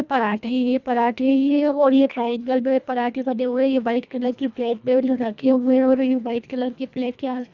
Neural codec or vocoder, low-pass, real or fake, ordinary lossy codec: codec, 24 kHz, 1.5 kbps, HILCodec; 7.2 kHz; fake; none